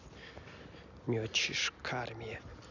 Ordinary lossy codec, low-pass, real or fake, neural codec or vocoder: none; 7.2 kHz; real; none